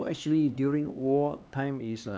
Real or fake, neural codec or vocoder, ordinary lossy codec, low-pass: fake; codec, 16 kHz, 2 kbps, X-Codec, HuBERT features, trained on LibriSpeech; none; none